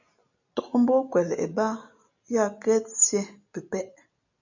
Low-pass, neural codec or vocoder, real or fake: 7.2 kHz; none; real